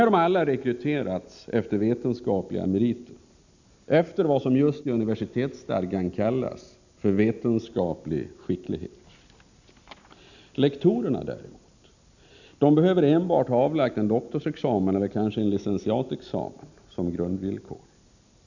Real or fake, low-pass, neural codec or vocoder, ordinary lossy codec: real; 7.2 kHz; none; none